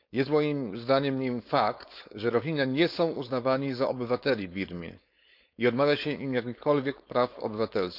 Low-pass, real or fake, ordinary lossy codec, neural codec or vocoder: 5.4 kHz; fake; none; codec, 16 kHz, 4.8 kbps, FACodec